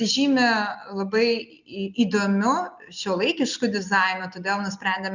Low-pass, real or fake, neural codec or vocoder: 7.2 kHz; real; none